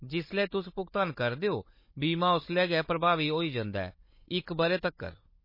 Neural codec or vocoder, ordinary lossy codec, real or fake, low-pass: codec, 16 kHz, 16 kbps, FunCodec, trained on Chinese and English, 50 frames a second; MP3, 24 kbps; fake; 5.4 kHz